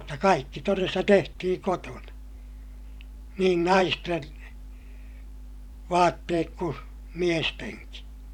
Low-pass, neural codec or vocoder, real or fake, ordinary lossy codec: 19.8 kHz; vocoder, 44.1 kHz, 128 mel bands every 512 samples, BigVGAN v2; fake; none